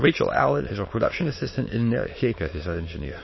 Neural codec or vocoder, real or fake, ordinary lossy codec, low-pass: autoencoder, 22.05 kHz, a latent of 192 numbers a frame, VITS, trained on many speakers; fake; MP3, 24 kbps; 7.2 kHz